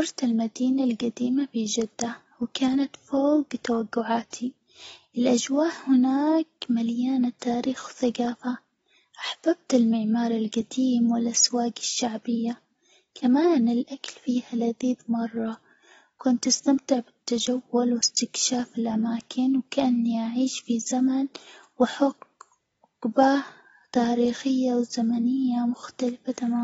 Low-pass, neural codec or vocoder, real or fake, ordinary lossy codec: 19.8 kHz; none; real; AAC, 24 kbps